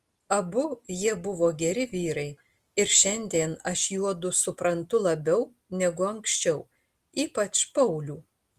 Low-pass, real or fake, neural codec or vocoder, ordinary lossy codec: 14.4 kHz; real; none; Opus, 24 kbps